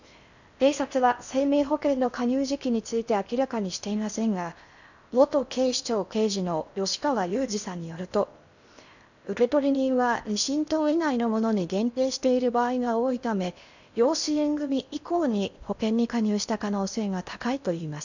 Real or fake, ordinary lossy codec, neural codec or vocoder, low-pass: fake; none; codec, 16 kHz in and 24 kHz out, 0.6 kbps, FocalCodec, streaming, 4096 codes; 7.2 kHz